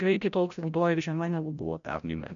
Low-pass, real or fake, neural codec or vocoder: 7.2 kHz; fake; codec, 16 kHz, 0.5 kbps, FreqCodec, larger model